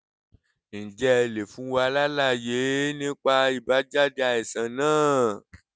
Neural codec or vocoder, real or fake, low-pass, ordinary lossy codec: none; real; none; none